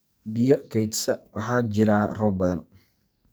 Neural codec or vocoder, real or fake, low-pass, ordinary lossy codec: codec, 44.1 kHz, 2.6 kbps, SNAC; fake; none; none